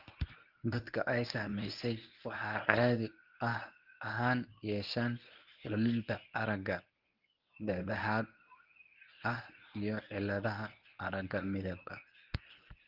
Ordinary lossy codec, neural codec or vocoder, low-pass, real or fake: Opus, 32 kbps; codec, 24 kHz, 0.9 kbps, WavTokenizer, medium speech release version 1; 5.4 kHz; fake